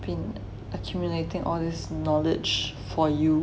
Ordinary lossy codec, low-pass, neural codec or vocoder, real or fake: none; none; none; real